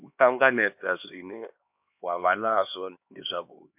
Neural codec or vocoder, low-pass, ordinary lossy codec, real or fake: codec, 16 kHz, 2 kbps, X-Codec, HuBERT features, trained on LibriSpeech; 3.6 kHz; MP3, 32 kbps; fake